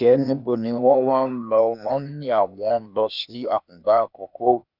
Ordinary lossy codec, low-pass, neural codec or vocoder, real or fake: none; 5.4 kHz; codec, 16 kHz, 0.8 kbps, ZipCodec; fake